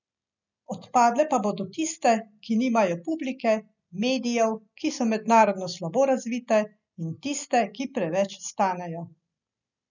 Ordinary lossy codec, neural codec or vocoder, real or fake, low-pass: none; none; real; 7.2 kHz